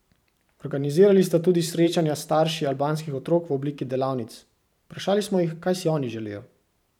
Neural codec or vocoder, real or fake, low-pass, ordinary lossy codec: vocoder, 44.1 kHz, 128 mel bands every 512 samples, BigVGAN v2; fake; 19.8 kHz; none